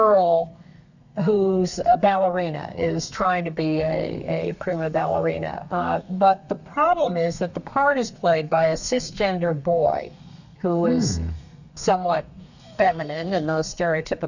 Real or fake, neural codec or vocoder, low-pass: fake; codec, 32 kHz, 1.9 kbps, SNAC; 7.2 kHz